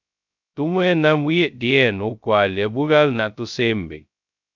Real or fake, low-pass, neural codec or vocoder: fake; 7.2 kHz; codec, 16 kHz, 0.2 kbps, FocalCodec